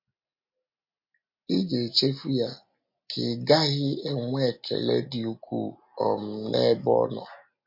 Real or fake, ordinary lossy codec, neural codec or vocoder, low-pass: real; MP3, 32 kbps; none; 5.4 kHz